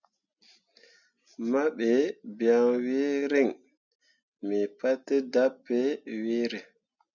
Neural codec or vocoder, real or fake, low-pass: none; real; 7.2 kHz